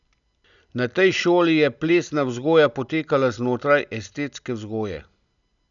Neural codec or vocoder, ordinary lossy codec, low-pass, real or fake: none; none; 7.2 kHz; real